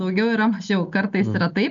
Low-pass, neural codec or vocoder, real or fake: 7.2 kHz; none; real